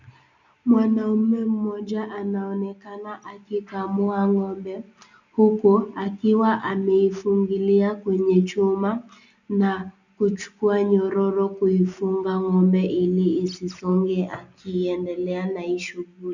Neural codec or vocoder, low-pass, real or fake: none; 7.2 kHz; real